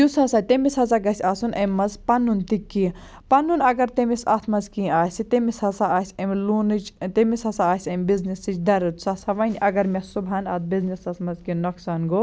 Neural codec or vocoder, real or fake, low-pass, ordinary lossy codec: none; real; none; none